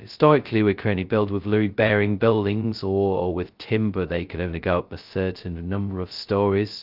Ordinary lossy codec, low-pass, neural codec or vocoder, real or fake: Opus, 32 kbps; 5.4 kHz; codec, 16 kHz, 0.2 kbps, FocalCodec; fake